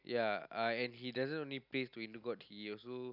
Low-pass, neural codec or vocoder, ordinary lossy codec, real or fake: 5.4 kHz; none; none; real